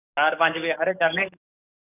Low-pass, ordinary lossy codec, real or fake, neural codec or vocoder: 3.6 kHz; none; fake; codec, 44.1 kHz, 7.8 kbps, DAC